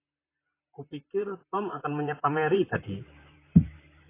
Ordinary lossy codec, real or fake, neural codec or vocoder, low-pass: AAC, 24 kbps; fake; vocoder, 44.1 kHz, 128 mel bands, Pupu-Vocoder; 3.6 kHz